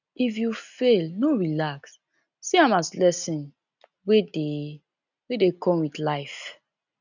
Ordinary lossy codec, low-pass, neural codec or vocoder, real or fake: none; 7.2 kHz; none; real